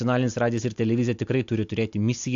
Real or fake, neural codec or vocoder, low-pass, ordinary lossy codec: real; none; 7.2 kHz; AAC, 64 kbps